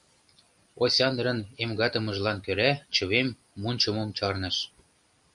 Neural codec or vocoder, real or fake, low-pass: none; real; 10.8 kHz